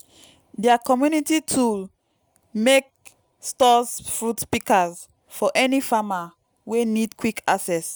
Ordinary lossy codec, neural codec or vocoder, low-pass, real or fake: none; none; none; real